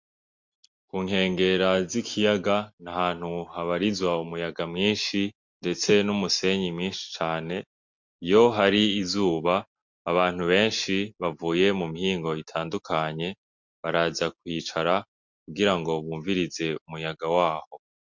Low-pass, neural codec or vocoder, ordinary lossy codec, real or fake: 7.2 kHz; none; MP3, 64 kbps; real